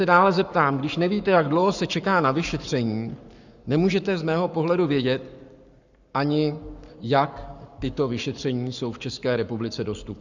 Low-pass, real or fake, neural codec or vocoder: 7.2 kHz; fake; codec, 44.1 kHz, 7.8 kbps, Pupu-Codec